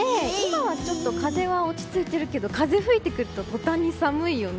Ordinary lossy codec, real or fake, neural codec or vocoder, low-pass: none; real; none; none